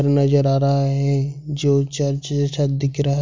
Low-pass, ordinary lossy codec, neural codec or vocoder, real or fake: 7.2 kHz; MP3, 64 kbps; none; real